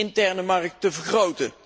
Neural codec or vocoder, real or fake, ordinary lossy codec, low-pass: none; real; none; none